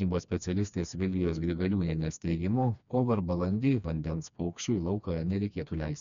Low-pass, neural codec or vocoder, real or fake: 7.2 kHz; codec, 16 kHz, 2 kbps, FreqCodec, smaller model; fake